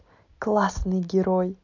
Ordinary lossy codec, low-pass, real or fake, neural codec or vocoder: none; 7.2 kHz; real; none